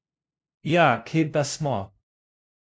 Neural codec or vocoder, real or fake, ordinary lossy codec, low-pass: codec, 16 kHz, 0.5 kbps, FunCodec, trained on LibriTTS, 25 frames a second; fake; none; none